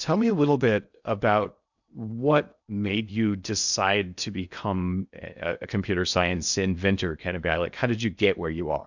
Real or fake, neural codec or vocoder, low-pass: fake; codec, 16 kHz in and 24 kHz out, 0.6 kbps, FocalCodec, streaming, 2048 codes; 7.2 kHz